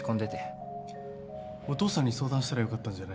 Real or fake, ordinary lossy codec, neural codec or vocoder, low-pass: real; none; none; none